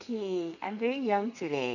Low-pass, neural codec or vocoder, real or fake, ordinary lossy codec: 7.2 kHz; codec, 32 kHz, 1.9 kbps, SNAC; fake; none